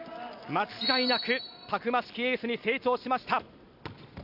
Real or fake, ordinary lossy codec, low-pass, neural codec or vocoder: real; AAC, 48 kbps; 5.4 kHz; none